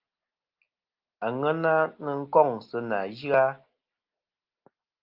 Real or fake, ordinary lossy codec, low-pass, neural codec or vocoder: real; Opus, 32 kbps; 5.4 kHz; none